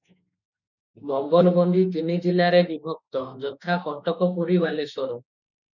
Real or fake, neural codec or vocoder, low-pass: fake; autoencoder, 48 kHz, 32 numbers a frame, DAC-VAE, trained on Japanese speech; 7.2 kHz